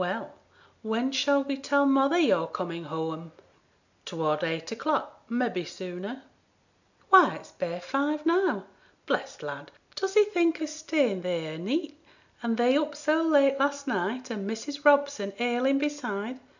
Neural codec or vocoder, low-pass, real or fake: none; 7.2 kHz; real